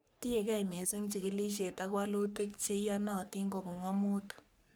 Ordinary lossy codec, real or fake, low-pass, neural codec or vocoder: none; fake; none; codec, 44.1 kHz, 3.4 kbps, Pupu-Codec